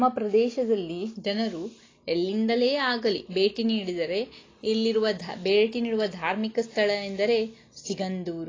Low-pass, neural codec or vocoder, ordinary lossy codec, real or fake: 7.2 kHz; none; AAC, 32 kbps; real